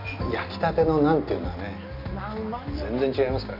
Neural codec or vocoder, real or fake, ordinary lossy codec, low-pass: none; real; AAC, 48 kbps; 5.4 kHz